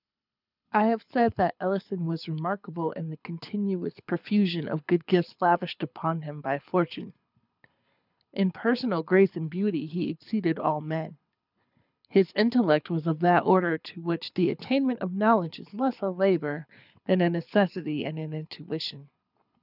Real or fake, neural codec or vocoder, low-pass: fake; codec, 24 kHz, 6 kbps, HILCodec; 5.4 kHz